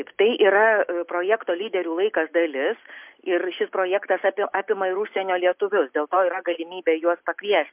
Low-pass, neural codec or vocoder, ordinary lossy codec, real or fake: 3.6 kHz; none; MP3, 32 kbps; real